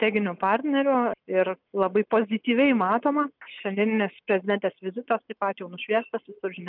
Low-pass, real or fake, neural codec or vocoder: 5.4 kHz; real; none